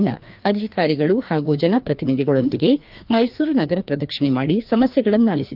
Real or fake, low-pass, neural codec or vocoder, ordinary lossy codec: fake; 5.4 kHz; codec, 16 kHz, 2 kbps, FreqCodec, larger model; Opus, 24 kbps